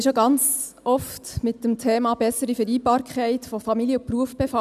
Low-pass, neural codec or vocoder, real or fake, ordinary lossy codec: 14.4 kHz; none; real; none